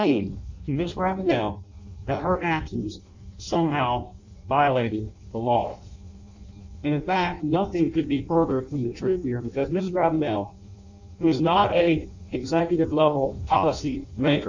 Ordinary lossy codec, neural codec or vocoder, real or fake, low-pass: AAC, 48 kbps; codec, 16 kHz in and 24 kHz out, 0.6 kbps, FireRedTTS-2 codec; fake; 7.2 kHz